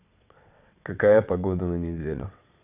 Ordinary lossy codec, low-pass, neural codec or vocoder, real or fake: none; 3.6 kHz; vocoder, 22.05 kHz, 80 mel bands, WaveNeXt; fake